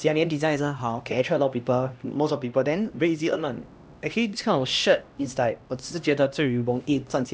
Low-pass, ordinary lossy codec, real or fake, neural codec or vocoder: none; none; fake; codec, 16 kHz, 1 kbps, X-Codec, HuBERT features, trained on LibriSpeech